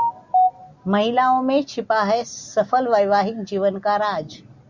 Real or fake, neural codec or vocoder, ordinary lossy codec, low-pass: real; none; Opus, 64 kbps; 7.2 kHz